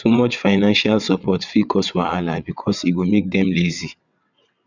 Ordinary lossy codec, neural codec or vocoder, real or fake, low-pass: none; vocoder, 22.05 kHz, 80 mel bands, WaveNeXt; fake; 7.2 kHz